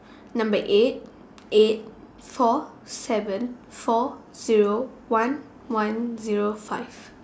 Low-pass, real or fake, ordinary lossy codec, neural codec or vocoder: none; real; none; none